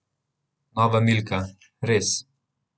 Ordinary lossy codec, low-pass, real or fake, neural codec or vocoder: none; none; real; none